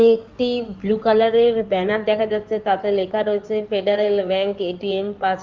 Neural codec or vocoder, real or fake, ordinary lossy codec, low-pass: codec, 16 kHz in and 24 kHz out, 2.2 kbps, FireRedTTS-2 codec; fake; Opus, 32 kbps; 7.2 kHz